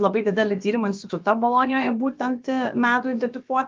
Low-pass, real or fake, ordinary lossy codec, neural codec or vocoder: 7.2 kHz; fake; Opus, 24 kbps; codec, 16 kHz, about 1 kbps, DyCAST, with the encoder's durations